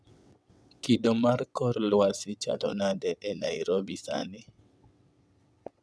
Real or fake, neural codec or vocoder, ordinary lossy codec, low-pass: fake; vocoder, 22.05 kHz, 80 mel bands, WaveNeXt; none; none